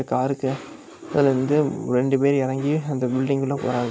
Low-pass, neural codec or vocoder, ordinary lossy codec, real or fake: none; none; none; real